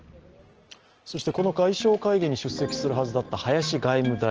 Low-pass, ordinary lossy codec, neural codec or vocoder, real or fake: 7.2 kHz; Opus, 16 kbps; none; real